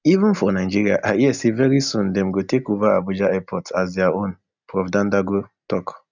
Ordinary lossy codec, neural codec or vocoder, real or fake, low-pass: none; none; real; 7.2 kHz